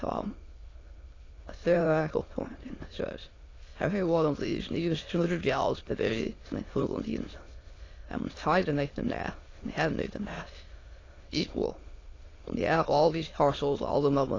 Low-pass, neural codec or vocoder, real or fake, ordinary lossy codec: 7.2 kHz; autoencoder, 22.05 kHz, a latent of 192 numbers a frame, VITS, trained on many speakers; fake; AAC, 32 kbps